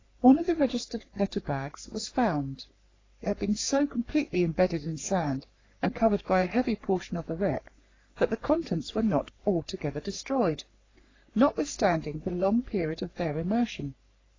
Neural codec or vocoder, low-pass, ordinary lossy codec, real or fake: codec, 44.1 kHz, 3.4 kbps, Pupu-Codec; 7.2 kHz; AAC, 32 kbps; fake